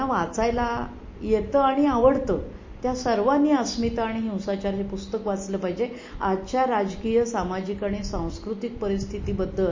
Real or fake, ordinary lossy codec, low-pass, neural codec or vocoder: real; MP3, 32 kbps; 7.2 kHz; none